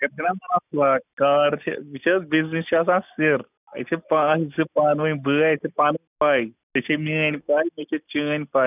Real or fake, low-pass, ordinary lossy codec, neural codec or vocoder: real; 3.6 kHz; none; none